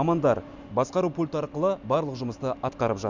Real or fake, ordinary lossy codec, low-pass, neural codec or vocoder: real; Opus, 64 kbps; 7.2 kHz; none